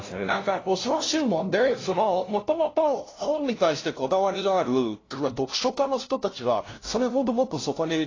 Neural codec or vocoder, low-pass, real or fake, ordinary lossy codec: codec, 16 kHz, 0.5 kbps, FunCodec, trained on LibriTTS, 25 frames a second; 7.2 kHz; fake; AAC, 32 kbps